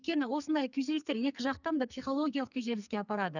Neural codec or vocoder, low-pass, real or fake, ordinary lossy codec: codec, 44.1 kHz, 2.6 kbps, SNAC; 7.2 kHz; fake; none